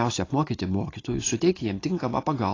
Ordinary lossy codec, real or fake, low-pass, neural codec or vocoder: AAC, 32 kbps; real; 7.2 kHz; none